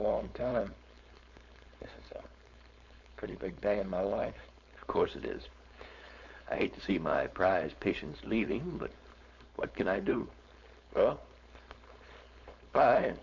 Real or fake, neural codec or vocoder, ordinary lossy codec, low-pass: fake; codec, 16 kHz, 4.8 kbps, FACodec; MP3, 64 kbps; 7.2 kHz